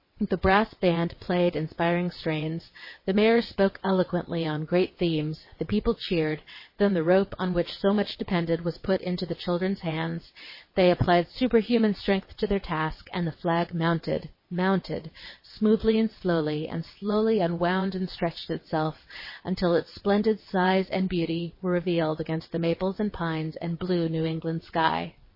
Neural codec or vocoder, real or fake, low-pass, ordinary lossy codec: vocoder, 22.05 kHz, 80 mel bands, WaveNeXt; fake; 5.4 kHz; MP3, 24 kbps